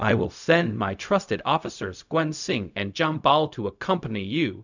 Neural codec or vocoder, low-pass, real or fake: codec, 16 kHz, 0.4 kbps, LongCat-Audio-Codec; 7.2 kHz; fake